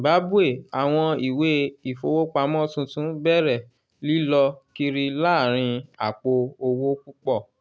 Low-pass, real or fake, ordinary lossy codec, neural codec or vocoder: none; real; none; none